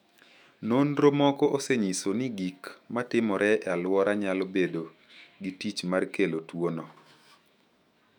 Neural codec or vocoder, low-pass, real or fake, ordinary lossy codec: autoencoder, 48 kHz, 128 numbers a frame, DAC-VAE, trained on Japanese speech; 19.8 kHz; fake; none